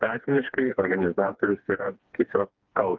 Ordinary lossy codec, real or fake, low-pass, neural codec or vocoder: Opus, 32 kbps; fake; 7.2 kHz; codec, 16 kHz, 2 kbps, FreqCodec, smaller model